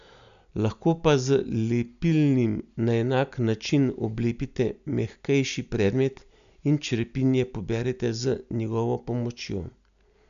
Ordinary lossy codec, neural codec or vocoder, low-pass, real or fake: none; none; 7.2 kHz; real